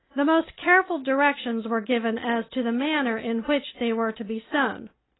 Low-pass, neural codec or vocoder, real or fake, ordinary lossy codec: 7.2 kHz; none; real; AAC, 16 kbps